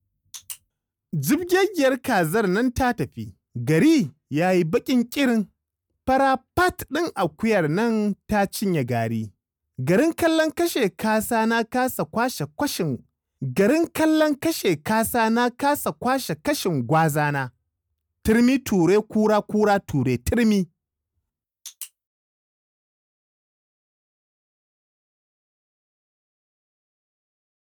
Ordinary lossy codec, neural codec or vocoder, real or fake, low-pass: none; none; real; none